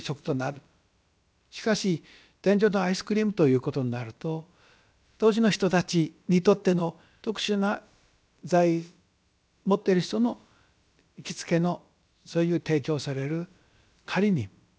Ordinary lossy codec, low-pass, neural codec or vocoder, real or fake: none; none; codec, 16 kHz, about 1 kbps, DyCAST, with the encoder's durations; fake